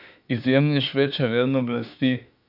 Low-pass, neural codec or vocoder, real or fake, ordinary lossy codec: 5.4 kHz; autoencoder, 48 kHz, 32 numbers a frame, DAC-VAE, trained on Japanese speech; fake; none